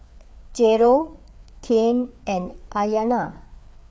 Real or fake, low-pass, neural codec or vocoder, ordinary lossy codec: fake; none; codec, 16 kHz, 4 kbps, FreqCodec, larger model; none